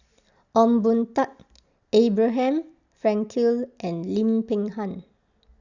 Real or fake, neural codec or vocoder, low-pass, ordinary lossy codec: real; none; 7.2 kHz; Opus, 64 kbps